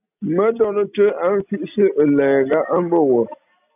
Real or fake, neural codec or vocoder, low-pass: real; none; 3.6 kHz